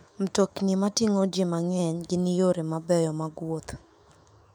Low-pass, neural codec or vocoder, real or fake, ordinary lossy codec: 19.8 kHz; autoencoder, 48 kHz, 128 numbers a frame, DAC-VAE, trained on Japanese speech; fake; MP3, 96 kbps